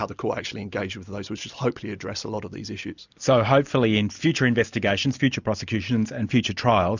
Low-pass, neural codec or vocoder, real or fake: 7.2 kHz; none; real